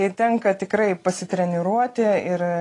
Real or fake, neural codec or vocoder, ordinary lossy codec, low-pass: real; none; AAC, 32 kbps; 10.8 kHz